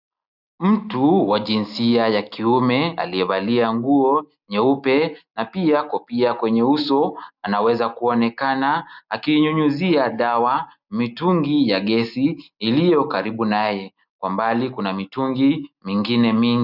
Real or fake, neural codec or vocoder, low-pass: real; none; 5.4 kHz